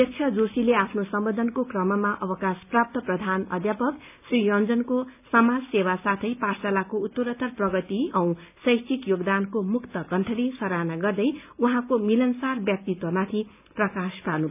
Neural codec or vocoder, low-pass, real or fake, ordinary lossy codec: none; 3.6 kHz; real; none